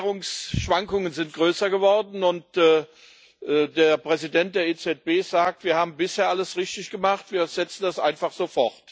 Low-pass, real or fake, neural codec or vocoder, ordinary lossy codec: none; real; none; none